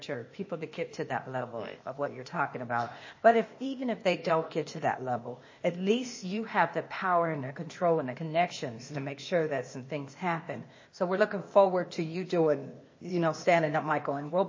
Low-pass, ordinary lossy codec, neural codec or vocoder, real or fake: 7.2 kHz; MP3, 32 kbps; codec, 16 kHz, 0.8 kbps, ZipCodec; fake